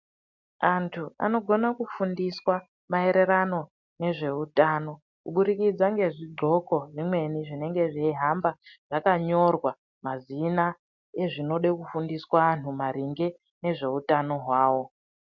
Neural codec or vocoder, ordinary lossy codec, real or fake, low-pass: none; MP3, 64 kbps; real; 7.2 kHz